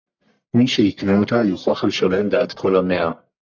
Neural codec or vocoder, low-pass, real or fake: codec, 44.1 kHz, 1.7 kbps, Pupu-Codec; 7.2 kHz; fake